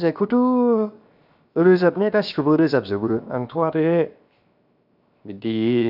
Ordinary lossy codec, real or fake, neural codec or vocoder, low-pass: none; fake; codec, 16 kHz, 0.7 kbps, FocalCodec; 5.4 kHz